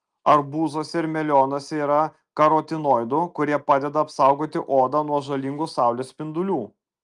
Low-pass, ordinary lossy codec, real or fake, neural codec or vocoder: 10.8 kHz; Opus, 32 kbps; real; none